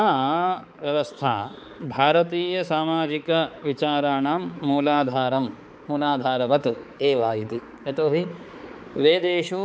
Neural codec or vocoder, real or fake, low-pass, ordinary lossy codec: codec, 16 kHz, 4 kbps, X-Codec, HuBERT features, trained on balanced general audio; fake; none; none